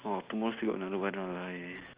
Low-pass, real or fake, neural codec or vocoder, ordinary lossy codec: 3.6 kHz; real; none; Opus, 24 kbps